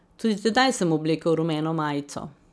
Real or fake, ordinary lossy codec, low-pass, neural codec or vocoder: real; none; none; none